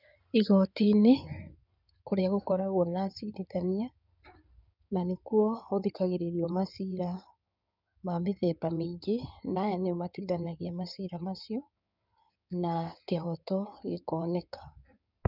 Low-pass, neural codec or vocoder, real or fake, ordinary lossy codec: 5.4 kHz; codec, 16 kHz in and 24 kHz out, 2.2 kbps, FireRedTTS-2 codec; fake; none